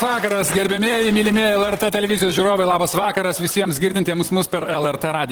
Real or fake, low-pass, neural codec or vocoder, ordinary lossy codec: fake; 19.8 kHz; vocoder, 44.1 kHz, 128 mel bands, Pupu-Vocoder; Opus, 16 kbps